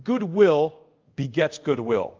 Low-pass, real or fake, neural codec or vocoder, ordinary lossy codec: 7.2 kHz; fake; codec, 24 kHz, 0.5 kbps, DualCodec; Opus, 32 kbps